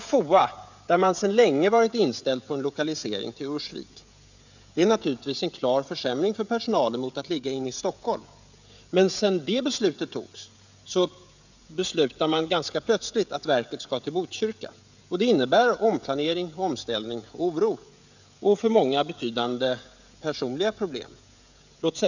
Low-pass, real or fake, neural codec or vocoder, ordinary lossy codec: 7.2 kHz; fake; codec, 16 kHz, 16 kbps, FreqCodec, smaller model; none